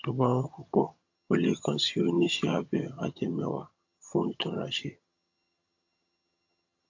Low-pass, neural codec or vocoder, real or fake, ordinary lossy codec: 7.2 kHz; vocoder, 22.05 kHz, 80 mel bands, HiFi-GAN; fake; AAC, 48 kbps